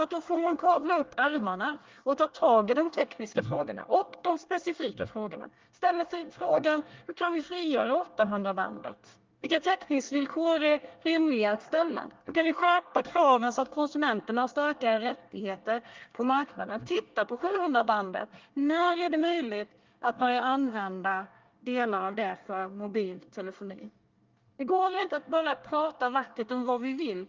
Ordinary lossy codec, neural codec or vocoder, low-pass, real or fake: Opus, 24 kbps; codec, 24 kHz, 1 kbps, SNAC; 7.2 kHz; fake